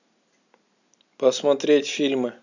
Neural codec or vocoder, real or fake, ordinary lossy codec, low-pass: none; real; none; 7.2 kHz